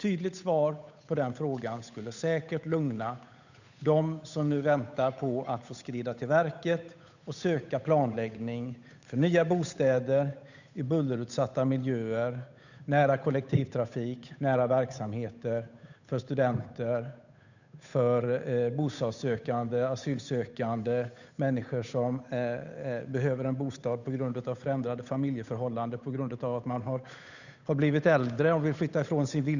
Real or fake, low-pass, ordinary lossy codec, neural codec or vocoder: fake; 7.2 kHz; none; codec, 16 kHz, 8 kbps, FunCodec, trained on Chinese and English, 25 frames a second